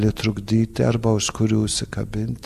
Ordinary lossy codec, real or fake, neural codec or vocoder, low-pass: AAC, 96 kbps; real; none; 14.4 kHz